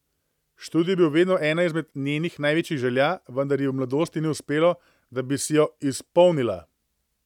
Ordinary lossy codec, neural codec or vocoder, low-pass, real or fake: none; none; 19.8 kHz; real